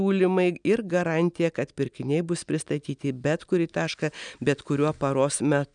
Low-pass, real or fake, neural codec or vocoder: 9.9 kHz; real; none